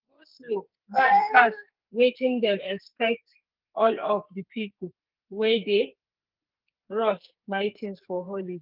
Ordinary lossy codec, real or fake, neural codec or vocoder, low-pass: Opus, 24 kbps; fake; codec, 44.1 kHz, 2.6 kbps, SNAC; 5.4 kHz